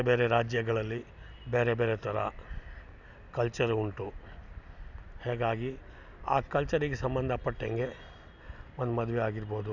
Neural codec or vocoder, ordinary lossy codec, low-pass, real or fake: none; none; 7.2 kHz; real